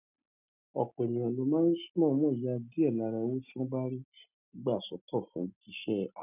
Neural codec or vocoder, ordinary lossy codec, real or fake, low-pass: none; none; real; 3.6 kHz